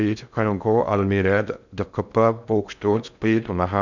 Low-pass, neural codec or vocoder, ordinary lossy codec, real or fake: 7.2 kHz; codec, 16 kHz in and 24 kHz out, 0.6 kbps, FocalCodec, streaming, 2048 codes; none; fake